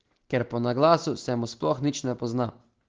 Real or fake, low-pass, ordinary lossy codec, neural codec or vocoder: real; 7.2 kHz; Opus, 16 kbps; none